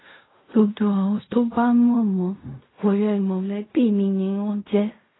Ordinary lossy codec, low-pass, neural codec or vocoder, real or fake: AAC, 16 kbps; 7.2 kHz; codec, 16 kHz in and 24 kHz out, 0.4 kbps, LongCat-Audio-Codec, fine tuned four codebook decoder; fake